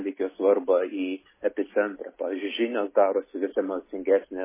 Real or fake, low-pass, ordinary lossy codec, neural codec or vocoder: real; 3.6 kHz; MP3, 16 kbps; none